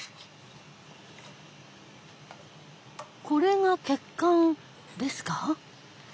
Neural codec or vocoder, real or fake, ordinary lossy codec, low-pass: none; real; none; none